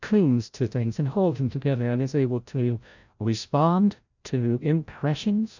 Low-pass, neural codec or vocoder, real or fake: 7.2 kHz; codec, 16 kHz, 0.5 kbps, FreqCodec, larger model; fake